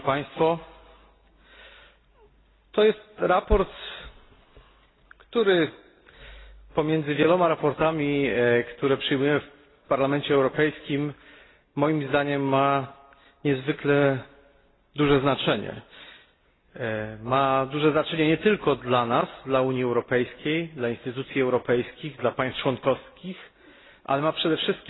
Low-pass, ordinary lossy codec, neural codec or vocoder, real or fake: 7.2 kHz; AAC, 16 kbps; none; real